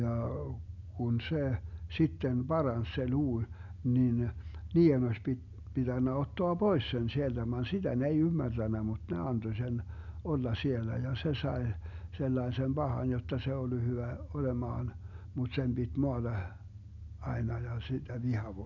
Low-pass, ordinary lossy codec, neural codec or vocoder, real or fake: 7.2 kHz; none; none; real